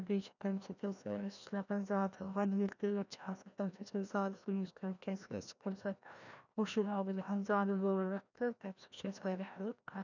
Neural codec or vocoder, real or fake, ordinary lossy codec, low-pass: codec, 16 kHz, 1 kbps, FreqCodec, larger model; fake; none; 7.2 kHz